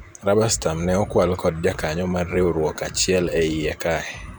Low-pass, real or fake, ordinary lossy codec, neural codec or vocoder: none; fake; none; vocoder, 44.1 kHz, 128 mel bands every 512 samples, BigVGAN v2